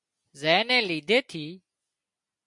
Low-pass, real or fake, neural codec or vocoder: 10.8 kHz; real; none